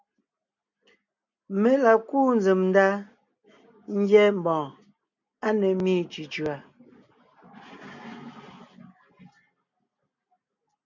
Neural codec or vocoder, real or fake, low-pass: none; real; 7.2 kHz